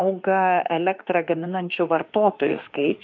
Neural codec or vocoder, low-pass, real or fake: autoencoder, 48 kHz, 32 numbers a frame, DAC-VAE, trained on Japanese speech; 7.2 kHz; fake